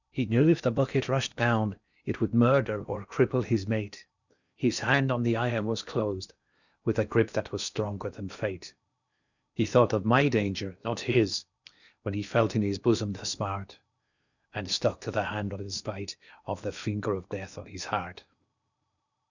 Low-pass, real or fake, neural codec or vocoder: 7.2 kHz; fake; codec, 16 kHz in and 24 kHz out, 0.8 kbps, FocalCodec, streaming, 65536 codes